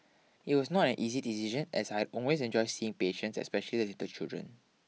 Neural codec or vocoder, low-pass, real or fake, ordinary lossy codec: none; none; real; none